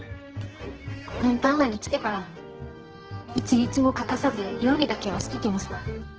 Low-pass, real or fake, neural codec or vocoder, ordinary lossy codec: 7.2 kHz; fake; codec, 24 kHz, 0.9 kbps, WavTokenizer, medium music audio release; Opus, 16 kbps